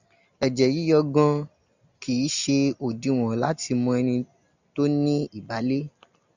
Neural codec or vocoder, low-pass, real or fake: none; 7.2 kHz; real